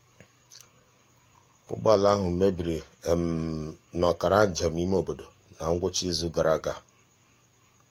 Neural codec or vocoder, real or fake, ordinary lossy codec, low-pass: codec, 44.1 kHz, 7.8 kbps, DAC; fake; AAC, 48 kbps; 19.8 kHz